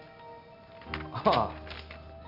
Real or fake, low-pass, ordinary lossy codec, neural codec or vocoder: real; 5.4 kHz; none; none